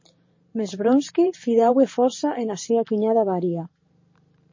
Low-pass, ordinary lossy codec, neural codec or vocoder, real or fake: 7.2 kHz; MP3, 32 kbps; vocoder, 44.1 kHz, 128 mel bands every 512 samples, BigVGAN v2; fake